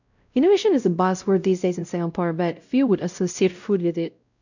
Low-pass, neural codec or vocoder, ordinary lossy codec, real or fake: 7.2 kHz; codec, 16 kHz, 0.5 kbps, X-Codec, WavLM features, trained on Multilingual LibriSpeech; none; fake